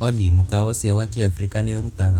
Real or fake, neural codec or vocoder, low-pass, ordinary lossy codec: fake; codec, 44.1 kHz, 2.6 kbps, DAC; 19.8 kHz; none